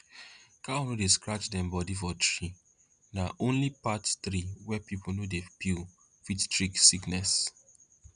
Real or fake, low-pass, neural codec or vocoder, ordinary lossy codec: real; 9.9 kHz; none; none